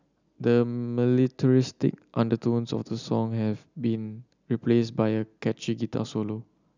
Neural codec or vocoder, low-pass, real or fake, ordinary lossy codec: none; 7.2 kHz; real; none